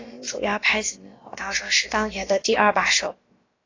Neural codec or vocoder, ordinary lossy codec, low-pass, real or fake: codec, 16 kHz, about 1 kbps, DyCAST, with the encoder's durations; AAC, 32 kbps; 7.2 kHz; fake